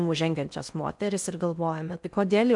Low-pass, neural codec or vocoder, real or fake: 10.8 kHz; codec, 16 kHz in and 24 kHz out, 0.6 kbps, FocalCodec, streaming, 4096 codes; fake